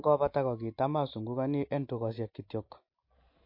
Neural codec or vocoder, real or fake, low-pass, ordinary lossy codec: none; real; 5.4 kHz; MP3, 32 kbps